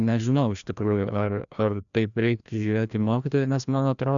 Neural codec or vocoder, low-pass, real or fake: codec, 16 kHz, 1 kbps, FreqCodec, larger model; 7.2 kHz; fake